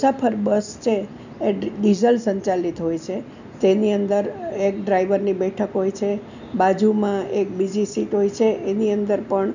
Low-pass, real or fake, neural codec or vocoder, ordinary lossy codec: 7.2 kHz; real; none; none